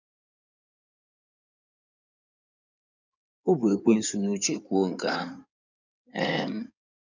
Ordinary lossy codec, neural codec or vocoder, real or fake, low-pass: none; codec, 16 kHz in and 24 kHz out, 2.2 kbps, FireRedTTS-2 codec; fake; 7.2 kHz